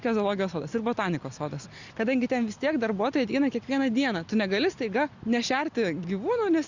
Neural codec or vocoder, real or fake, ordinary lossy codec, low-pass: none; real; Opus, 64 kbps; 7.2 kHz